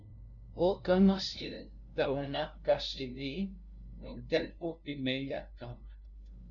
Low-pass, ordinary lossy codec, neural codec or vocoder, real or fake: 7.2 kHz; MP3, 64 kbps; codec, 16 kHz, 0.5 kbps, FunCodec, trained on LibriTTS, 25 frames a second; fake